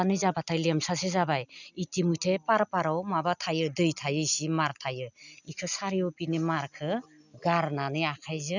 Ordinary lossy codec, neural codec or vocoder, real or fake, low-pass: none; none; real; 7.2 kHz